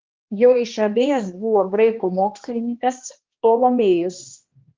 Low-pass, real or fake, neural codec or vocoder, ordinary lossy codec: 7.2 kHz; fake; codec, 16 kHz, 1 kbps, X-Codec, HuBERT features, trained on balanced general audio; Opus, 32 kbps